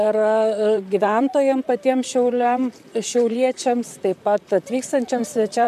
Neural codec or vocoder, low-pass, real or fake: vocoder, 44.1 kHz, 128 mel bands, Pupu-Vocoder; 14.4 kHz; fake